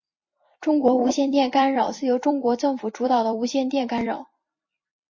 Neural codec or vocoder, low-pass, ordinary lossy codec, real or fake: vocoder, 44.1 kHz, 128 mel bands every 256 samples, BigVGAN v2; 7.2 kHz; MP3, 32 kbps; fake